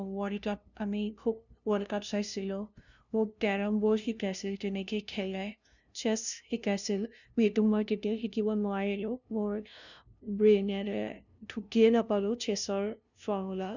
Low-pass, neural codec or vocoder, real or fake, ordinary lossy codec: 7.2 kHz; codec, 16 kHz, 0.5 kbps, FunCodec, trained on LibriTTS, 25 frames a second; fake; none